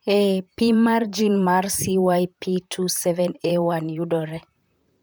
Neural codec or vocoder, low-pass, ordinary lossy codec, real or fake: vocoder, 44.1 kHz, 128 mel bands, Pupu-Vocoder; none; none; fake